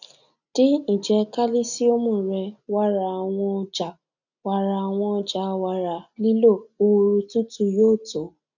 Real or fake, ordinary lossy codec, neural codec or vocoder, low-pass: real; none; none; 7.2 kHz